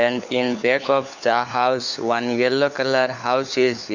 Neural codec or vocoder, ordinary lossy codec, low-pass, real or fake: codec, 16 kHz, 4 kbps, FunCodec, trained on LibriTTS, 50 frames a second; none; 7.2 kHz; fake